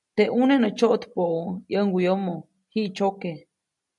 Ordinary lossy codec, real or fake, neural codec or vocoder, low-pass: MP3, 96 kbps; real; none; 10.8 kHz